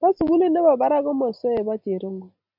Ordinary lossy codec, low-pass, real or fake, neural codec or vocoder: MP3, 48 kbps; 5.4 kHz; real; none